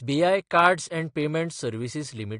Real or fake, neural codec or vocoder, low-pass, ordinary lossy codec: real; none; 9.9 kHz; AAC, 48 kbps